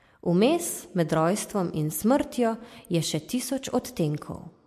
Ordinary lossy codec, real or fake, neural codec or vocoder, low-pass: MP3, 64 kbps; real; none; 14.4 kHz